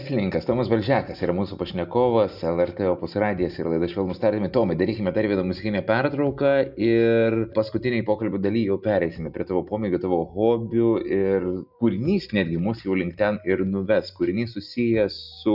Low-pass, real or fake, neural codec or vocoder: 5.4 kHz; real; none